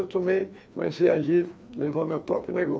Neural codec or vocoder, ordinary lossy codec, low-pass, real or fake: codec, 16 kHz, 2 kbps, FreqCodec, larger model; none; none; fake